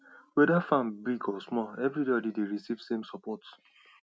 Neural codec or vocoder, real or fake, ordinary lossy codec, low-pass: none; real; none; none